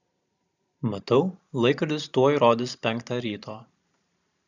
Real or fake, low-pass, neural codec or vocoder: fake; 7.2 kHz; vocoder, 44.1 kHz, 128 mel bands every 512 samples, BigVGAN v2